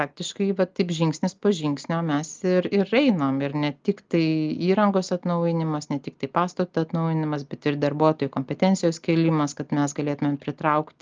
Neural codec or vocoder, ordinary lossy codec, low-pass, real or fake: none; Opus, 24 kbps; 7.2 kHz; real